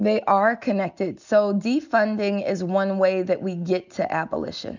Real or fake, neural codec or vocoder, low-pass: real; none; 7.2 kHz